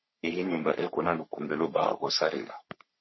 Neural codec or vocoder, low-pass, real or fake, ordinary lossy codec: codec, 44.1 kHz, 3.4 kbps, Pupu-Codec; 7.2 kHz; fake; MP3, 24 kbps